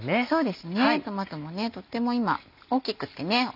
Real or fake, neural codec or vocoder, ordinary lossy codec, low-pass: real; none; none; 5.4 kHz